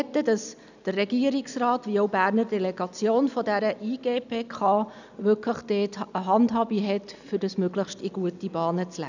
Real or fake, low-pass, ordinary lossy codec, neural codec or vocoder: real; 7.2 kHz; none; none